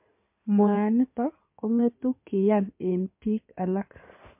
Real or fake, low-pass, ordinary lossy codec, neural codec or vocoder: fake; 3.6 kHz; MP3, 32 kbps; codec, 16 kHz in and 24 kHz out, 2.2 kbps, FireRedTTS-2 codec